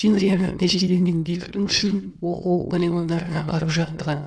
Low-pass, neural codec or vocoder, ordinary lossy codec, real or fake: none; autoencoder, 22.05 kHz, a latent of 192 numbers a frame, VITS, trained on many speakers; none; fake